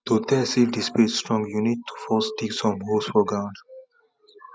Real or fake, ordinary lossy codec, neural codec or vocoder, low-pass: real; none; none; none